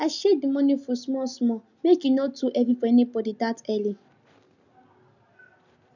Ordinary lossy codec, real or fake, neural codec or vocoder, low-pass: none; real; none; 7.2 kHz